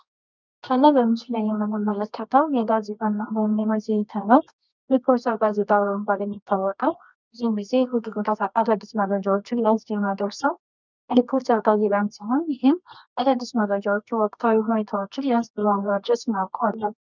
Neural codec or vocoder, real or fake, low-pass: codec, 24 kHz, 0.9 kbps, WavTokenizer, medium music audio release; fake; 7.2 kHz